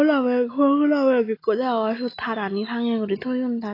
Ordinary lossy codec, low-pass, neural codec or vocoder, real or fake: AAC, 24 kbps; 5.4 kHz; none; real